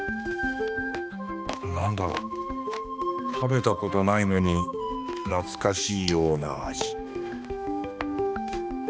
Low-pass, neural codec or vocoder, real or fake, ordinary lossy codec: none; codec, 16 kHz, 2 kbps, X-Codec, HuBERT features, trained on balanced general audio; fake; none